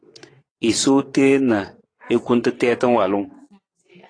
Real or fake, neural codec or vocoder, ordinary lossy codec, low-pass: fake; vocoder, 22.05 kHz, 80 mel bands, WaveNeXt; AAC, 32 kbps; 9.9 kHz